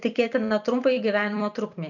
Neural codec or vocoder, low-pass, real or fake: vocoder, 44.1 kHz, 128 mel bands every 256 samples, BigVGAN v2; 7.2 kHz; fake